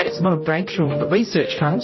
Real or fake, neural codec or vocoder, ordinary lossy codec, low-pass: fake; codec, 16 kHz, 0.5 kbps, X-Codec, HuBERT features, trained on general audio; MP3, 24 kbps; 7.2 kHz